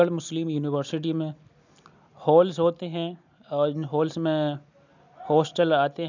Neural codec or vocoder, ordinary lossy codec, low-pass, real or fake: none; none; 7.2 kHz; real